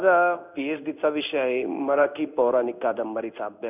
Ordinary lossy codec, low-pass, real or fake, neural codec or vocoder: none; 3.6 kHz; fake; codec, 16 kHz in and 24 kHz out, 1 kbps, XY-Tokenizer